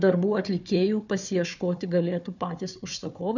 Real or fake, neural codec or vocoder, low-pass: fake; codec, 16 kHz, 4 kbps, FunCodec, trained on Chinese and English, 50 frames a second; 7.2 kHz